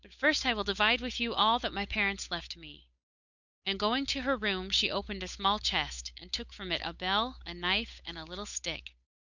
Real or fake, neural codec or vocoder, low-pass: fake; codec, 16 kHz, 8 kbps, FunCodec, trained on Chinese and English, 25 frames a second; 7.2 kHz